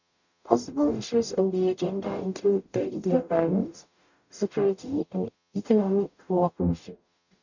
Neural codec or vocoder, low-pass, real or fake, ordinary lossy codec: codec, 44.1 kHz, 0.9 kbps, DAC; 7.2 kHz; fake; none